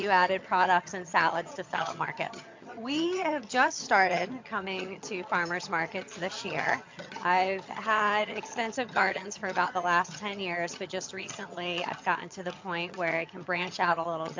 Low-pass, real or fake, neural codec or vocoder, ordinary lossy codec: 7.2 kHz; fake; vocoder, 22.05 kHz, 80 mel bands, HiFi-GAN; MP3, 48 kbps